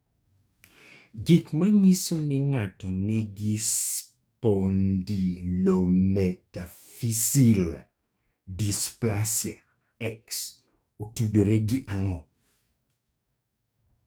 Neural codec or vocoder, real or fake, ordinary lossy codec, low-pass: codec, 44.1 kHz, 2.6 kbps, DAC; fake; none; none